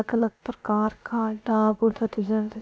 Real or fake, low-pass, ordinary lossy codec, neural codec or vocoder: fake; none; none; codec, 16 kHz, about 1 kbps, DyCAST, with the encoder's durations